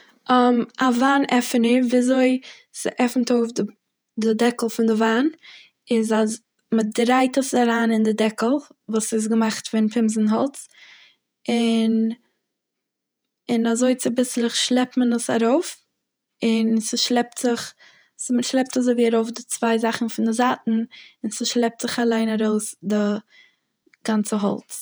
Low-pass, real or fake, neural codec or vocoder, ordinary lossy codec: none; fake; vocoder, 48 kHz, 128 mel bands, Vocos; none